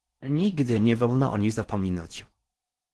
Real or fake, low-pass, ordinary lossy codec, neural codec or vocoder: fake; 10.8 kHz; Opus, 16 kbps; codec, 16 kHz in and 24 kHz out, 0.6 kbps, FocalCodec, streaming, 4096 codes